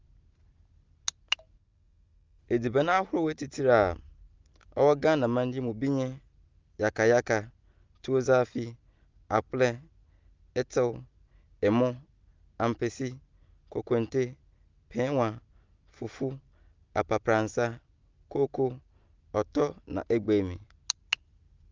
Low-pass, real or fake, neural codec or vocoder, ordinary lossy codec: 7.2 kHz; real; none; Opus, 32 kbps